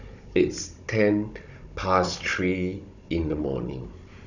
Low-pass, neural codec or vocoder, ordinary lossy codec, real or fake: 7.2 kHz; codec, 16 kHz, 16 kbps, FunCodec, trained on Chinese and English, 50 frames a second; none; fake